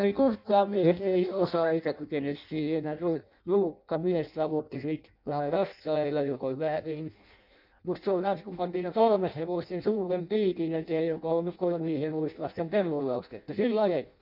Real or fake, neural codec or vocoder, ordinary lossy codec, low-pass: fake; codec, 16 kHz in and 24 kHz out, 0.6 kbps, FireRedTTS-2 codec; none; 5.4 kHz